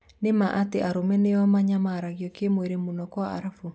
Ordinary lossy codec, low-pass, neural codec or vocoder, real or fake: none; none; none; real